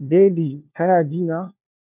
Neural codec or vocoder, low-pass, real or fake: codec, 16 kHz, 1 kbps, FunCodec, trained on LibriTTS, 50 frames a second; 3.6 kHz; fake